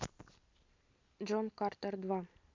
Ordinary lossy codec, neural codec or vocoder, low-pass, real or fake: MP3, 64 kbps; none; 7.2 kHz; real